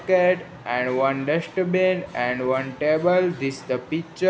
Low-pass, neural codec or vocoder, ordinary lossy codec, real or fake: none; none; none; real